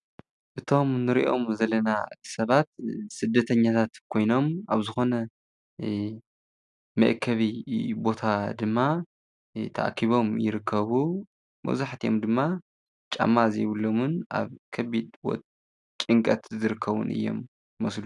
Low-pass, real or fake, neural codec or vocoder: 10.8 kHz; real; none